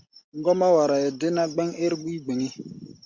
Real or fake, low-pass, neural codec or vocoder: real; 7.2 kHz; none